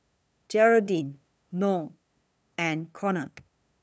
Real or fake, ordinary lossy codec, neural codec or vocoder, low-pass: fake; none; codec, 16 kHz, 2 kbps, FunCodec, trained on LibriTTS, 25 frames a second; none